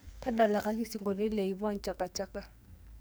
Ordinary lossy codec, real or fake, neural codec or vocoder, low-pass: none; fake; codec, 44.1 kHz, 2.6 kbps, SNAC; none